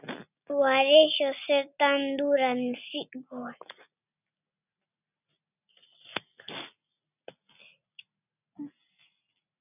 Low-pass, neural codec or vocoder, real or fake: 3.6 kHz; none; real